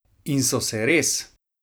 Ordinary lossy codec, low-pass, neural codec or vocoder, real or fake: none; none; vocoder, 44.1 kHz, 128 mel bands every 256 samples, BigVGAN v2; fake